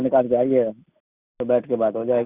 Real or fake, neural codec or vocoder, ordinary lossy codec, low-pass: real; none; Opus, 64 kbps; 3.6 kHz